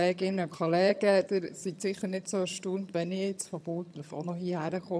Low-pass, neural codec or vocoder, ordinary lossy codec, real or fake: none; vocoder, 22.05 kHz, 80 mel bands, HiFi-GAN; none; fake